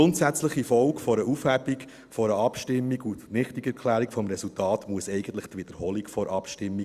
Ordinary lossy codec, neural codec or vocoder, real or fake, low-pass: Opus, 64 kbps; none; real; 14.4 kHz